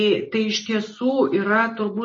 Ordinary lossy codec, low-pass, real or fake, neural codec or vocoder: MP3, 32 kbps; 10.8 kHz; real; none